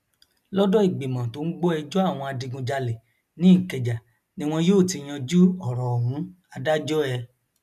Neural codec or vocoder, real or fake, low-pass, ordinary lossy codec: none; real; 14.4 kHz; none